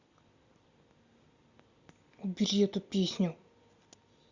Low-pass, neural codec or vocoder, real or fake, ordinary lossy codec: 7.2 kHz; none; real; Opus, 64 kbps